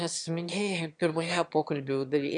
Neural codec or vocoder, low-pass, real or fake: autoencoder, 22.05 kHz, a latent of 192 numbers a frame, VITS, trained on one speaker; 9.9 kHz; fake